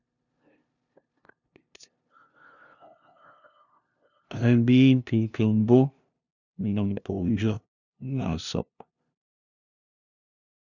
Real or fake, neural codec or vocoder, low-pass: fake; codec, 16 kHz, 0.5 kbps, FunCodec, trained on LibriTTS, 25 frames a second; 7.2 kHz